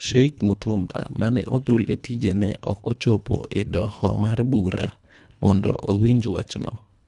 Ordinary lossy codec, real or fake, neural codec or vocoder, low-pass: none; fake; codec, 24 kHz, 1.5 kbps, HILCodec; 10.8 kHz